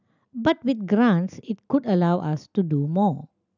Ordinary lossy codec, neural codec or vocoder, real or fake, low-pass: none; none; real; 7.2 kHz